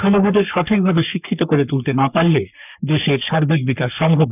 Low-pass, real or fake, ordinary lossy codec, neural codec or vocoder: 3.6 kHz; fake; none; codec, 44.1 kHz, 3.4 kbps, Pupu-Codec